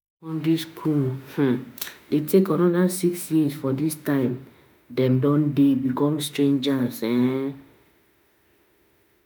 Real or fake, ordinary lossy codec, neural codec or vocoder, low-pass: fake; none; autoencoder, 48 kHz, 32 numbers a frame, DAC-VAE, trained on Japanese speech; none